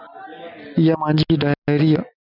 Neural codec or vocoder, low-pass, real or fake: none; 5.4 kHz; real